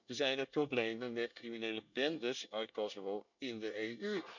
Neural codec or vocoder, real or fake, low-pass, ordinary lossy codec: codec, 24 kHz, 1 kbps, SNAC; fake; 7.2 kHz; none